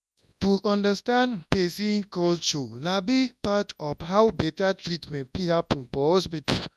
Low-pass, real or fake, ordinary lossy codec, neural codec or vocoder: none; fake; none; codec, 24 kHz, 0.9 kbps, WavTokenizer, large speech release